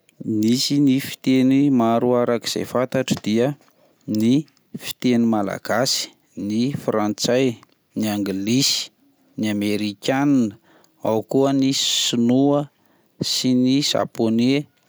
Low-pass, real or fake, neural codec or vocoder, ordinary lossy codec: none; real; none; none